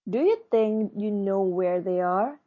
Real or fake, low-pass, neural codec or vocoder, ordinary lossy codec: real; 7.2 kHz; none; MP3, 32 kbps